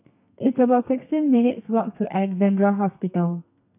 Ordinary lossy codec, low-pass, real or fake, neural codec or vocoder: none; 3.6 kHz; fake; codec, 32 kHz, 1.9 kbps, SNAC